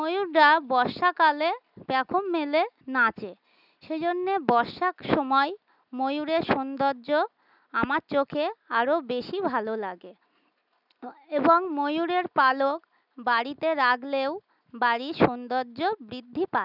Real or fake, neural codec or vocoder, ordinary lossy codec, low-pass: real; none; none; 5.4 kHz